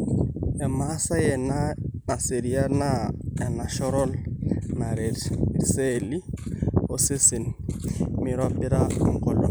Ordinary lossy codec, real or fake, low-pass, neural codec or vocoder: none; real; none; none